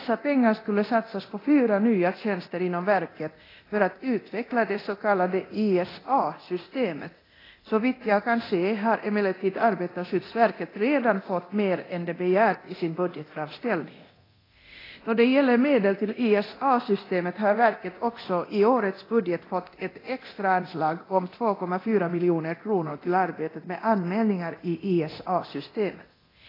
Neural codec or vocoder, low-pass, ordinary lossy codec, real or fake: codec, 24 kHz, 0.9 kbps, DualCodec; 5.4 kHz; AAC, 24 kbps; fake